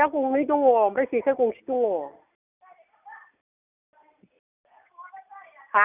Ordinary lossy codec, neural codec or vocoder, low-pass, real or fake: none; none; 3.6 kHz; real